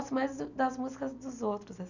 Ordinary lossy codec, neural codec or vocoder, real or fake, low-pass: none; none; real; 7.2 kHz